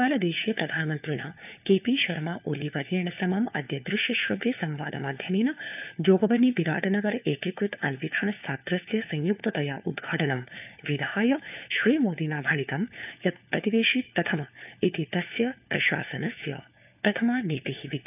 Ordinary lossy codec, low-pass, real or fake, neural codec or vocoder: none; 3.6 kHz; fake; codec, 16 kHz, 4 kbps, FunCodec, trained on LibriTTS, 50 frames a second